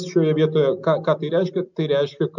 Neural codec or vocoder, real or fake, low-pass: none; real; 7.2 kHz